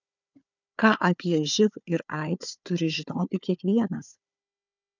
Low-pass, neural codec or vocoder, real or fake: 7.2 kHz; codec, 16 kHz, 4 kbps, FunCodec, trained on Chinese and English, 50 frames a second; fake